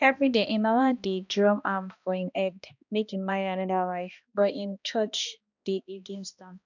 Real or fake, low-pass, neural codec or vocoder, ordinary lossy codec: fake; 7.2 kHz; codec, 16 kHz, 1 kbps, X-Codec, HuBERT features, trained on balanced general audio; none